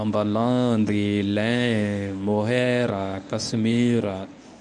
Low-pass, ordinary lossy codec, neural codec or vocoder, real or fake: none; none; codec, 24 kHz, 0.9 kbps, WavTokenizer, medium speech release version 1; fake